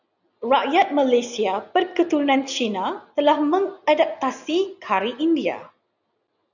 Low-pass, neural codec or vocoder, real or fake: 7.2 kHz; none; real